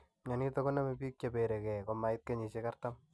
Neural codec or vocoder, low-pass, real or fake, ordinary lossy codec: none; none; real; none